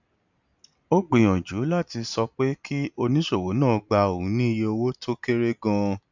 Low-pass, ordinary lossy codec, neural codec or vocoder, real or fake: 7.2 kHz; none; none; real